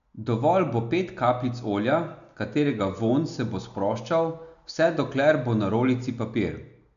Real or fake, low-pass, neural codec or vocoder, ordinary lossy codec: real; 7.2 kHz; none; none